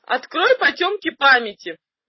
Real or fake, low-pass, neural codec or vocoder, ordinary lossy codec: real; 7.2 kHz; none; MP3, 24 kbps